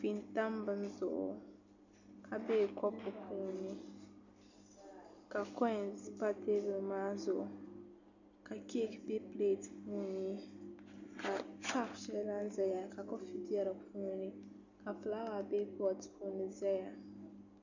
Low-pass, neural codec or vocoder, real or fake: 7.2 kHz; none; real